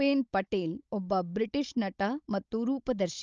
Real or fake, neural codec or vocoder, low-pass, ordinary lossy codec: fake; codec, 16 kHz, 16 kbps, FunCodec, trained on Chinese and English, 50 frames a second; 7.2 kHz; Opus, 24 kbps